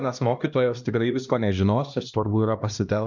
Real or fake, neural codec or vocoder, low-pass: fake; codec, 16 kHz, 1 kbps, X-Codec, HuBERT features, trained on LibriSpeech; 7.2 kHz